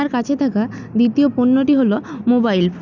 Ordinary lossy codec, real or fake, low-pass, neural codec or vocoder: none; real; 7.2 kHz; none